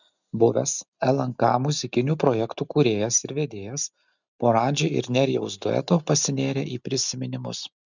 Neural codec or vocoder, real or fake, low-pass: none; real; 7.2 kHz